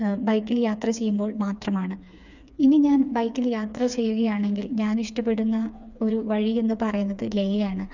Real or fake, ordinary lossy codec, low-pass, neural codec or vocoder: fake; none; 7.2 kHz; codec, 16 kHz, 4 kbps, FreqCodec, smaller model